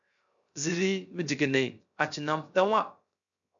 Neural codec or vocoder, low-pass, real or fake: codec, 16 kHz, 0.3 kbps, FocalCodec; 7.2 kHz; fake